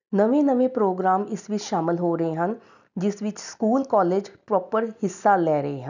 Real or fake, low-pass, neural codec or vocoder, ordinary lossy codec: real; 7.2 kHz; none; none